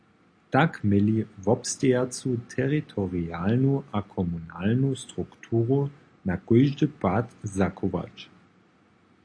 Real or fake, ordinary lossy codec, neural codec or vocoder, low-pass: real; AAC, 48 kbps; none; 9.9 kHz